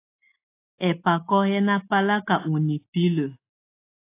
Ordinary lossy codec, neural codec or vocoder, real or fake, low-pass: AAC, 24 kbps; none; real; 3.6 kHz